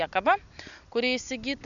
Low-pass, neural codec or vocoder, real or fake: 7.2 kHz; none; real